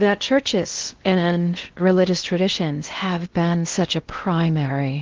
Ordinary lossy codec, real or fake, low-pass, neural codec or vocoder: Opus, 16 kbps; fake; 7.2 kHz; codec, 16 kHz in and 24 kHz out, 0.6 kbps, FocalCodec, streaming, 2048 codes